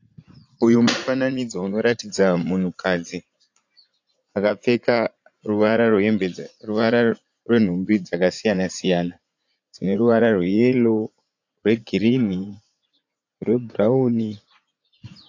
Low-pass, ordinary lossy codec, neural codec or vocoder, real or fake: 7.2 kHz; AAC, 48 kbps; vocoder, 44.1 kHz, 80 mel bands, Vocos; fake